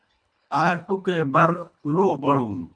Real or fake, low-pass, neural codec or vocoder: fake; 9.9 kHz; codec, 24 kHz, 1.5 kbps, HILCodec